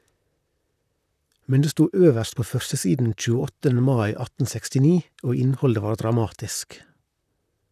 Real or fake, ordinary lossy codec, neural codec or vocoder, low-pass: fake; none; vocoder, 44.1 kHz, 128 mel bands, Pupu-Vocoder; 14.4 kHz